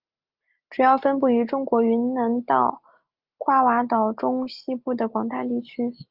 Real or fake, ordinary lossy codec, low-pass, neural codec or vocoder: real; Opus, 32 kbps; 5.4 kHz; none